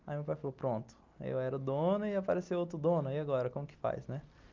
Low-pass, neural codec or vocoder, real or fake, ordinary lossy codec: 7.2 kHz; none; real; Opus, 24 kbps